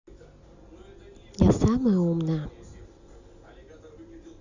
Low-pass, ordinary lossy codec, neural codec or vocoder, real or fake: 7.2 kHz; Opus, 64 kbps; none; real